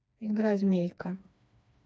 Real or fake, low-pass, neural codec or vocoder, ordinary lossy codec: fake; none; codec, 16 kHz, 2 kbps, FreqCodec, smaller model; none